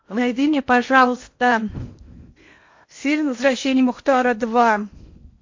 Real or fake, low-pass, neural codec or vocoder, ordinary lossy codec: fake; 7.2 kHz; codec, 16 kHz in and 24 kHz out, 0.8 kbps, FocalCodec, streaming, 65536 codes; MP3, 48 kbps